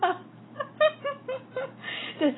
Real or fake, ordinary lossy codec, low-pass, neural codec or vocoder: real; AAC, 16 kbps; 7.2 kHz; none